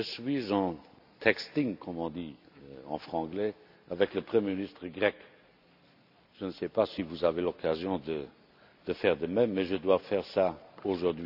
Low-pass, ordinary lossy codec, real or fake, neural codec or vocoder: 5.4 kHz; none; real; none